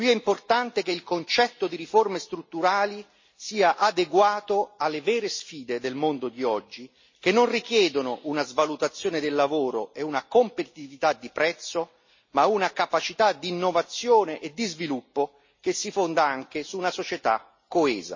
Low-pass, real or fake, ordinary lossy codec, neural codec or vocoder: 7.2 kHz; real; MP3, 32 kbps; none